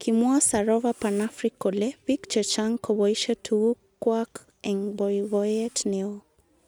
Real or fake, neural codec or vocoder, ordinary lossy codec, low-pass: real; none; none; none